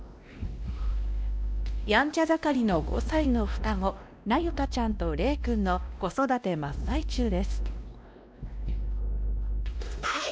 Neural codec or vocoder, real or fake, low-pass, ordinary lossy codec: codec, 16 kHz, 1 kbps, X-Codec, WavLM features, trained on Multilingual LibriSpeech; fake; none; none